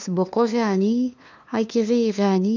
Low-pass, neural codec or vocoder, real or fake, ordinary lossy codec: none; codec, 16 kHz, 2 kbps, FunCodec, trained on LibriTTS, 25 frames a second; fake; none